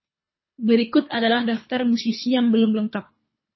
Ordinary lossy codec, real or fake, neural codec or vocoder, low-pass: MP3, 24 kbps; fake; codec, 24 kHz, 3 kbps, HILCodec; 7.2 kHz